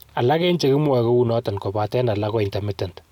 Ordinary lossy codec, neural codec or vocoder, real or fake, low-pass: none; none; real; 19.8 kHz